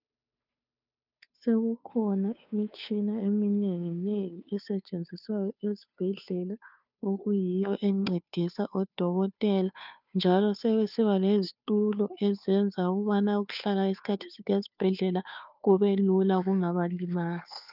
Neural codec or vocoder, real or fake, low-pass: codec, 16 kHz, 2 kbps, FunCodec, trained on Chinese and English, 25 frames a second; fake; 5.4 kHz